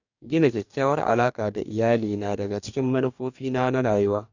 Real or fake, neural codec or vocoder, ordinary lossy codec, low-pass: fake; codec, 44.1 kHz, 2.6 kbps, DAC; none; 7.2 kHz